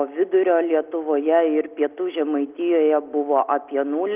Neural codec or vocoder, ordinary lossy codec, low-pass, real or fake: none; Opus, 24 kbps; 3.6 kHz; real